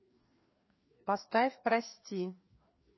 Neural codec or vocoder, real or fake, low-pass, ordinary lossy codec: codec, 16 kHz, 2 kbps, FreqCodec, larger model; fake; 7.2 kHz; MP3, 24 kbps